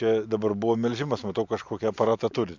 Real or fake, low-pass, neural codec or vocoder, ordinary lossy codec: real; 7.2 kHz; none; MP3, 64 kbps